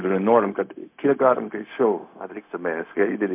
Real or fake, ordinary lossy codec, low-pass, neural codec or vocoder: fake; MP3, 32 kbps; 3.6 kHz; codec, 16 kHz, 0.4 kbps, LongCat-Audio-Codec